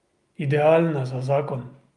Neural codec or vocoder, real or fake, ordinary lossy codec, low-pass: none; real; Opus, 32 kbps; 10.8 kHz